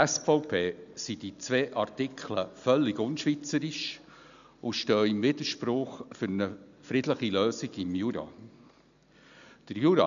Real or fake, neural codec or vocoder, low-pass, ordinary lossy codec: real; none; 7.2 kHz; none